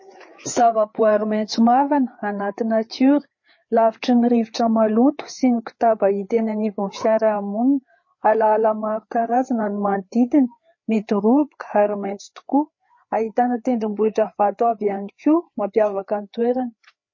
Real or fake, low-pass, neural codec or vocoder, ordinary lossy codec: fake; 7.2 kHz; codec, 16 kHz, 4 kbps, FreqCodec, larger model; MP3, 32 kbps